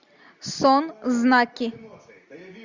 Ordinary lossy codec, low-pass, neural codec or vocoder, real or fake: Opus, 64 kbps; 7.2 kHz; none; real